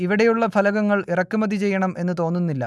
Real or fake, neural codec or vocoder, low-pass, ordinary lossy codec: real; none; none; none